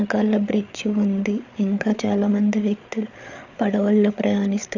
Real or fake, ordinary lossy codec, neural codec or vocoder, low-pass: fake; none; codec, 16 kHz, 8 kbps, FreqCodec, larger model; 7.2 kHz